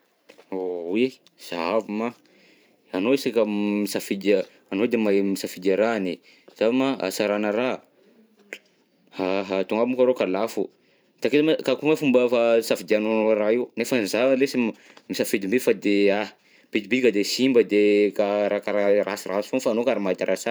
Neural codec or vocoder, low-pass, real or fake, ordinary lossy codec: vocoder, 44.1 kHz, 128 mel bands every 512 samples, BigVGAN v2; none; fake; none